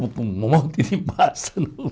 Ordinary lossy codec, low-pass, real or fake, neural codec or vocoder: none; none; real; none